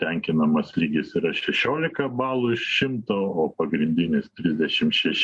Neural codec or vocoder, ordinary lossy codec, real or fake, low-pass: none; MP3, 48 kbps; real; 10.8 kHz